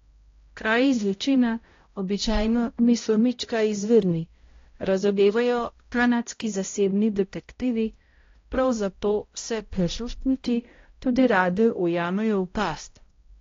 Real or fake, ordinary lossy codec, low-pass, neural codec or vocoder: fake; AAC, 32 kbps; 7.2 kHz; codec, 16 kHz, 0.5 kbps, X-Codec, HuBERT features, trained on balanced general audio